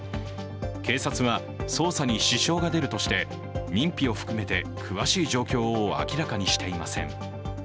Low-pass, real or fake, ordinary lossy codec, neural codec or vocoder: none; real; none; none